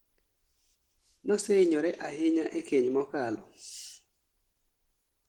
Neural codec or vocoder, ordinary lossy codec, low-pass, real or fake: none; Opus, 16 kbps; 19.8 kHz; real